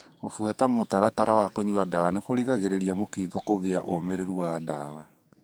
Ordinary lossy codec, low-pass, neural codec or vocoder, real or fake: none; none; codec, 44.1 kHz, 2.6 kbps, SNAC; fake